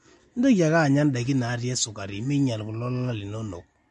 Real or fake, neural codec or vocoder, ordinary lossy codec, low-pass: real; none; MP3, 48 kbps; 14.4 kHz